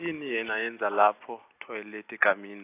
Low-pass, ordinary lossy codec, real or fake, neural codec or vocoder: 3.6 kHz; AAC, 24 kbps; real; none